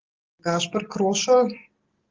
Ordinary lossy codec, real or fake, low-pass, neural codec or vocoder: Opus, 16 kbps; real; 7.2 kHz; none